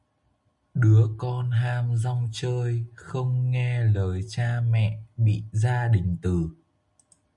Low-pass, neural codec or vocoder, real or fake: 10.8 kHz; none; real